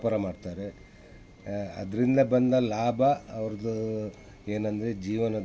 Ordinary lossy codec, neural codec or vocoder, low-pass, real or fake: none; none; none; real